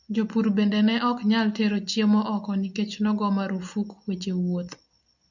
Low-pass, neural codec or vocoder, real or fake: 7.2 kHz; none; real